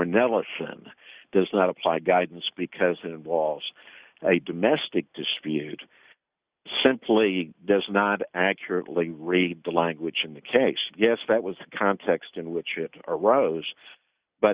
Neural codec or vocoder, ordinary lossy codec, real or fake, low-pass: none; Opus, 64 kbps; real; 3.6 kHz